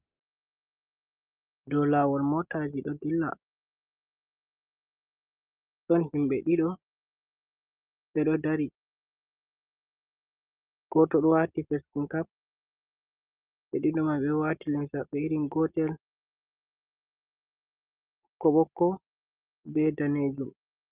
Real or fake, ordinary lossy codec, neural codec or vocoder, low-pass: real; Opus, 24 kbps; none; 3.6 kHz